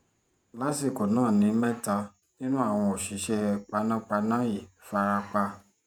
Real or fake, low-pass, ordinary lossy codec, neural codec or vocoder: fake; none; none; vocoder, 48 kHz, 128 mel bands, Vocos